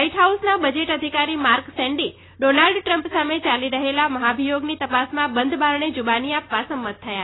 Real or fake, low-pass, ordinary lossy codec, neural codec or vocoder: real; 7.2 kHz; AAC, 16 kbps; none